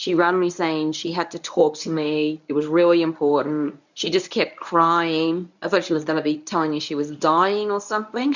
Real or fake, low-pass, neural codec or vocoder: fake; 7.2 kHz; codec, 24 kHz, 0.9 kbps, WavTokenizer, medium speech release version 1